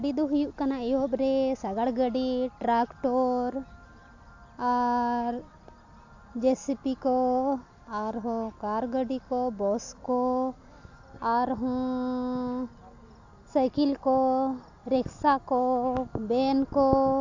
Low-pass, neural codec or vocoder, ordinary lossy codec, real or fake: 7.2 kHz; none; AAC, 48 kbps; real